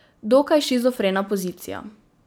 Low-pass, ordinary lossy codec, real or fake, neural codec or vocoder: none; none; real; none